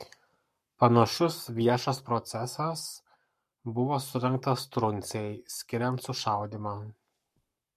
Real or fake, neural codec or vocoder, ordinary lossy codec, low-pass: fake; codec, 44.1 kHz, 7.8 kbps, Pupu-Codec; MP3, 64 kbps; 14.4 kHz